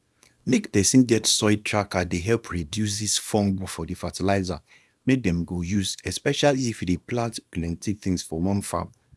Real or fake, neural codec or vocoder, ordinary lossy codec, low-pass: fake; codec, 24 kHz, 0.9 kbps, WavTokenizer, small release; none; none